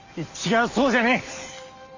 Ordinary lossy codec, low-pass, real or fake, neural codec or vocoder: Opus, 64 kbps; 7.2 kHz; real; none